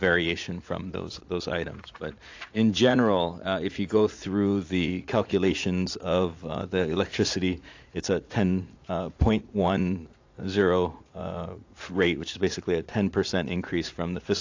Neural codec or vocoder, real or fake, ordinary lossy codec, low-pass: vocoder, 44.1 kHz, 80 mel bands, Vocos; fake; AAC, 48 kbps; 7.2 kHz